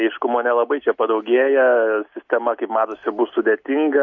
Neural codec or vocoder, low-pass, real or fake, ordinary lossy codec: none; 7.2 kHz; real; MP3, 48 kbps